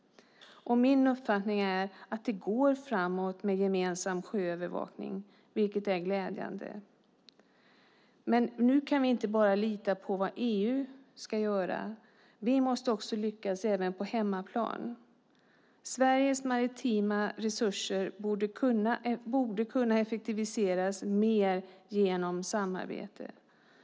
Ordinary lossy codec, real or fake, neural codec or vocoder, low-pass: none; real; none; none